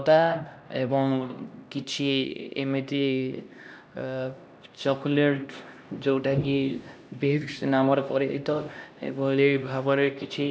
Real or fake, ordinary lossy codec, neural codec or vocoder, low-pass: fake; none; codec, 16 kHz, 1 kbps, X-Codec, HuBERT features, trained on LibriSpeech; none